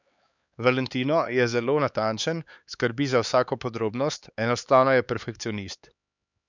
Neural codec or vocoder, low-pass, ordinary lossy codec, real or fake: codec, 16 kHz, 4 kbps, X-Codec, HuBERT features, trained on LibriSpeech; 7.2 kHz; none; fake